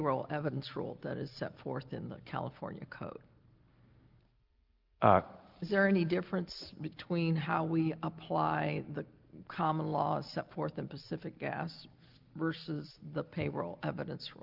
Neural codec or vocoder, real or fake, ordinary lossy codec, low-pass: none; real; Opus, 32 kbps; 5.4 kHz